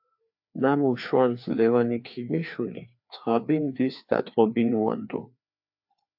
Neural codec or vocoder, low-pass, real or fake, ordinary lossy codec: codec, 16 kHz, 2 kbps, FreqCodec, larger model; 5.4 kHz; fake; AAC, 48 kbps